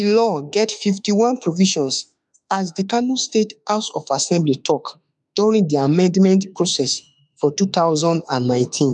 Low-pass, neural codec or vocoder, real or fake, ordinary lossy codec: 10.8 kHz; autoencoder, 48 kHz, 32 numbers a frame, DAC-VAE, trained on Japanese speech; fake; none